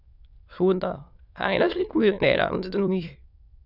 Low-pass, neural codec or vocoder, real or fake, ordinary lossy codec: 5.4 kHz; autoencoder, 22.05 kHz, a latent of 192 numbers a frame, VITS, trained on many speakers; fake; none